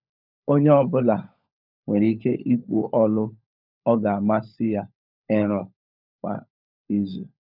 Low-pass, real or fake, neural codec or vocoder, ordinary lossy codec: 5.4 kHz; fake; codec, 16 kHz, 16 kbps, FunCodec, trained on LibriTTS, 50 frames a second; none